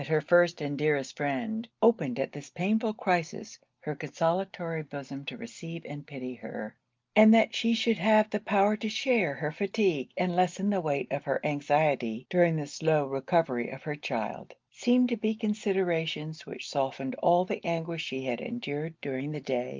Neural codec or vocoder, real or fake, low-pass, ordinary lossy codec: none; real; 7.2 kHz; Opus, 24 kbps